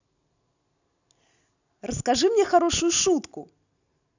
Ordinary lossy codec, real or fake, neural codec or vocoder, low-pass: none; real; none; 7.2 kHz